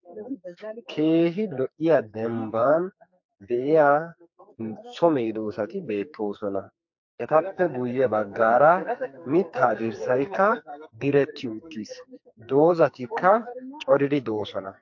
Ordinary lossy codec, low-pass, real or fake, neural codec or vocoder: MP3, 48 kbps; 7.2 kHz; fake; codec, 44.1 kHz, 2.6 kbps, SNAC